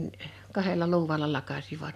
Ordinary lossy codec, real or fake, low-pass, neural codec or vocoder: none; real; 14.4 kHz; none